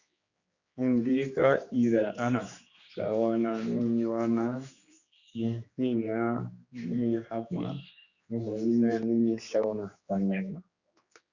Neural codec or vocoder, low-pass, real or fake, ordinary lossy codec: codec, 16 kHz, 2 kbps, X-Codec, HuBERT features, trained on general audio; 7.2 kHz; fake; Opus, 64 kbps